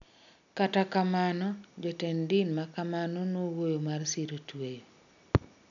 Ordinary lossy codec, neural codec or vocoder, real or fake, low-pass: none; none; real; 7.2 kHz